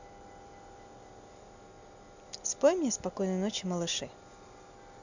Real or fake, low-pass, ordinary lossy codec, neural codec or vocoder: real; 7.2 kHz; none; none